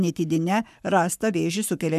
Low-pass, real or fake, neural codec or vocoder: 14.4 kHz; fake; codec, 44.1 kHz, 7.8 kbps, Pupu-Codec